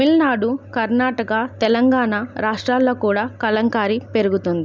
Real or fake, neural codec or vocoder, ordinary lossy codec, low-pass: real; none; none; none